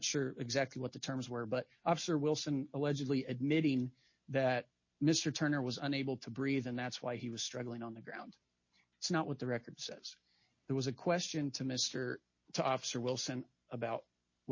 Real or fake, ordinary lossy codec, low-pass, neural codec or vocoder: real; MP3, 32 kbps; 7.2 kHz; none